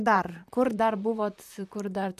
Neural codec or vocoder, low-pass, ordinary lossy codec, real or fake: vocoder, 44.1 kHz, 128 mel bands, Pupu-Vocoder; 14.4 kHz; AAC, 96 kbps; fake